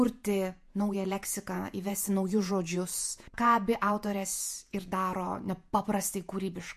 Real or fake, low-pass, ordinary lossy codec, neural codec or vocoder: fake; 14.4 kHz; MP3, 64 kbps; vocoder, 48 kHz, 128 mel bands, Vocos